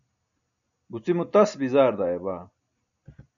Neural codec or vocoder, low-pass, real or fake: none; 7.2 kHz; real